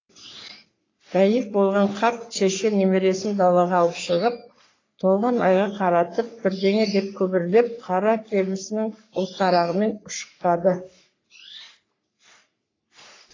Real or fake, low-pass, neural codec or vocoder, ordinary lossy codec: fake; 7.2 kHz; codec, 44.1 kHz, 3.4 kbps, Pupu-Codec; AAC, 32 kbps